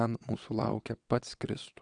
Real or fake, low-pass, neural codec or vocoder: fake; 9.9 kHz; vocoder, 22.05 kHz, 80 mel bands, WaveNeXt